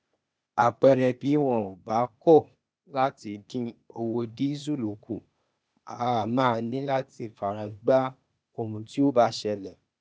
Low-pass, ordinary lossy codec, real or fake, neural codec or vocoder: none; none; fake; codec, 16 kHz, 0.8 kbps, ZipCodec